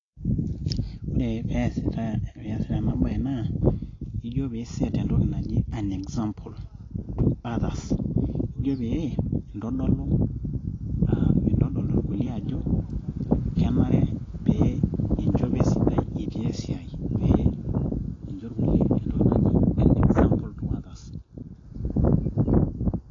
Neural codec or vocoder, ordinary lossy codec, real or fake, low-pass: none; AAC, 32 kbps; real; 7.2 kHz